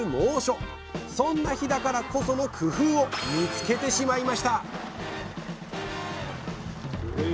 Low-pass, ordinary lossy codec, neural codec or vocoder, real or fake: none; none; none; real